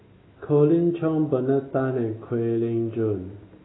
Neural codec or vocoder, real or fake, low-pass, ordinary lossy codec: none; real; 7.2 kHz; AAC, 16 kbps